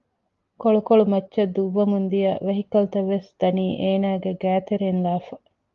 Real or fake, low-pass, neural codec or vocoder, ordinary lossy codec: real; 7.2 kHz; none; Opus, 32 kbps